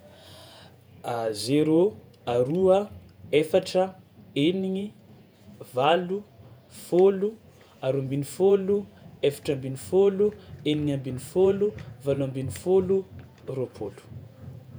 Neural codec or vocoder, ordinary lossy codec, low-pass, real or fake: vocoder, 48 kHz, 128 mel bands, Vocos; none; none; fake